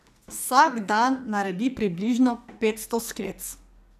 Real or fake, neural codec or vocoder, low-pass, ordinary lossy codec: fake; codec, 44.1 kHz, 2.6 kbps, SNAC; 14.4 kHz; none